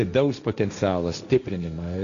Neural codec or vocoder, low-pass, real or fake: codec, 16 kHz, 1.1 kbps, Voila-Tokenizer; 7.2 kHz; fake